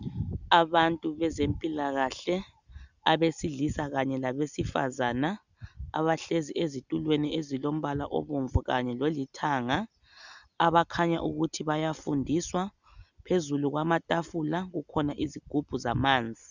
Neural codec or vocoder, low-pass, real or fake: none; 7.2 kHz; real